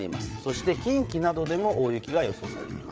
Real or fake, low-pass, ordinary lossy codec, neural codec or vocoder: fake; none; none; codec, 16 kHz, 8 kbps, FreqCodec, larger model